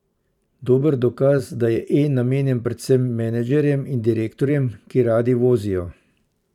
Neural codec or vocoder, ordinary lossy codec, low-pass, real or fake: vocoder, 44.1 kHz, 128 mel bands every 512 samples, BigVGAN v2; none; 19.8 kHz; fake